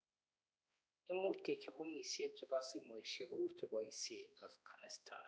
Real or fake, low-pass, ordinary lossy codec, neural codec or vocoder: fake; none; none; codec, 16 kHz, 1 kbps, X-Codec, HuBERT features, trained on balanced general audio